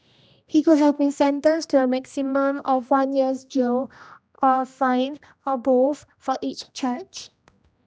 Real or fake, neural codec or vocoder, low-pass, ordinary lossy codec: fake; codec, 16 kHz, 1 kbps, X-Codec, HuBERT features, trained on general audio; none; none